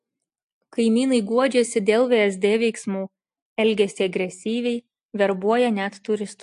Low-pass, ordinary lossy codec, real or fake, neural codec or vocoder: 10.8 kHz; AAC, 64 kbps; real; none